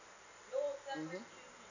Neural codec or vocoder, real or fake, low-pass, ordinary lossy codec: none; real; 7.2 kHz; none